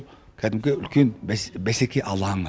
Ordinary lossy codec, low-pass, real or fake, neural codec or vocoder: none; none; real; none